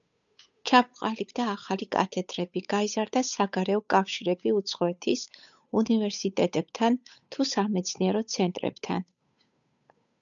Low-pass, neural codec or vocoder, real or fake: 7.2 kHz; codec, 16 kHz, 8 kbps, FunCodec, trained on Chinese and English, 25 frames a second; fake